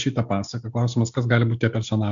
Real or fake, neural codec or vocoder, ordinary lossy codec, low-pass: real; none; MP3, 64 kbps; 7.2 kHz